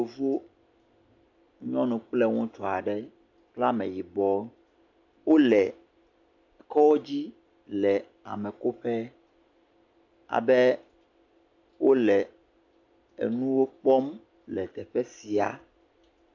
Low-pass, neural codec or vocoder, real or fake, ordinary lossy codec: 7.2 kHz; none; real; AAC, 48 kbps